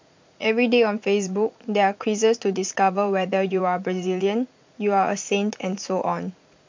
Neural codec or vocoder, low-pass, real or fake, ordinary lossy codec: vocoder, 44.1 kHz, 80 mel bands, Vocos; 7.2 kHz; fake; MP3, 64 kbps